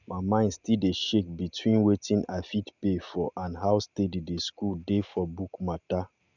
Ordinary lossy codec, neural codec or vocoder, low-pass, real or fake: none; none; 7.2 kHz; real